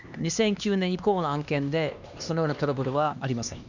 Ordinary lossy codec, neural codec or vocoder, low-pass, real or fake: none; codec, 16 kHz, 2 kbps, X-Codec, HuBERT features, trained on LibriSpeech; 7.2 kHz; fake